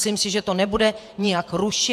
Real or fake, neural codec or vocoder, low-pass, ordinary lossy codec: fake; vocoder, 48 kHz, 128 mel bands, Vocos; 14.4 kHz; AAC, 96 kbps